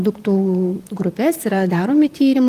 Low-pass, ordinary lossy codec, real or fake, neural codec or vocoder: 14.4 kHz; Opus, 24 kbps; fake; vocoder, 44.1 kHz, 128 mel bands, Pupu-Vocoder